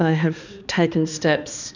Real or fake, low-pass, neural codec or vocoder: fake; 7.2 kHz; codec, 16 kHz, 2 kbps, X-Codec, HuBERT features, trained on balanced general audio